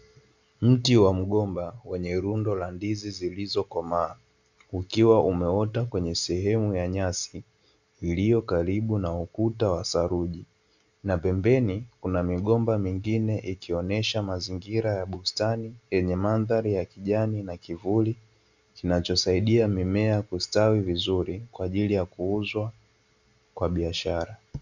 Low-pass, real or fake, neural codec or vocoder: 7.2 kHz; real; none